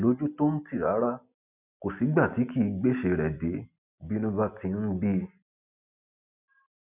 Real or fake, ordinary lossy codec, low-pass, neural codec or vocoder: real; none; 3.6 kHz; none